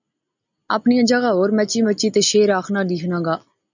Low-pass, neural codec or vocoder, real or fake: 7.2 kHz; none; real